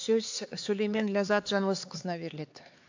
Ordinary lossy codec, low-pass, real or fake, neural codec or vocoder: AAC, 48 kbps; 7.2 kHz; fake; codec, 16 kHz, 4 kbps, X-Codec, HuBERT features, trained on LibriSpeech